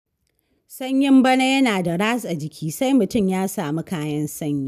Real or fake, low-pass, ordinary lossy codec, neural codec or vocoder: real; 14.4 kHz; none; none